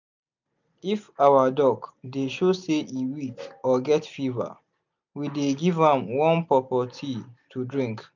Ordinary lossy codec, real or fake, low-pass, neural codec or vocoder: none; real; 7.2 kHz; none